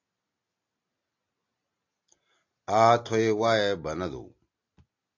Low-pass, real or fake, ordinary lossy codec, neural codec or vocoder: 7.2 kHz; real; AAC, 48 kbps; none